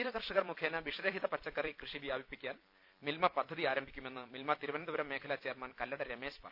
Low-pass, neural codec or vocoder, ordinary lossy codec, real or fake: 5.4 kHz; vocoder, 44.1 kHz, 128 mel bands every 256 samples, BigVGAN v2; none; fake